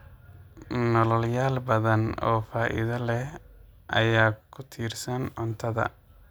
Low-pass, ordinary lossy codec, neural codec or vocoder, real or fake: none; none; none; real